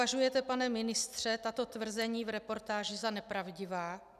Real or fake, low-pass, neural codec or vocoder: real; 14.4 kHz; none